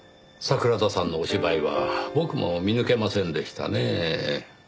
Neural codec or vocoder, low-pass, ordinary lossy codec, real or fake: none; none; none; real